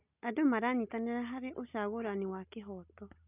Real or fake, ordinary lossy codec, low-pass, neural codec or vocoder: real; none; 3.6 kHz; none